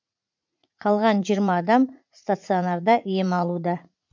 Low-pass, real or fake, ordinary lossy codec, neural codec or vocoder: 7.2 kHz; fake; MP3, 64 kbps; vocoder, 44.1 kHz, 80 mel bands, Vocos